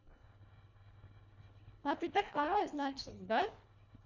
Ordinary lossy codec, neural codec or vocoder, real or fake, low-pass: none; codec, 24 kHz, 1.5 kbps, HILCodec; fake; 7.2 kHz